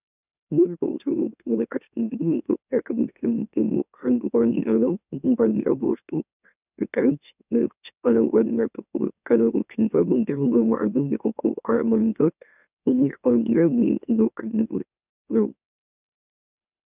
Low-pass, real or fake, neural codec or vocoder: 3.6 kHz; fake; autoencoder, 44.1 kHz, a latent of 192 numbers a frame, MeloTTS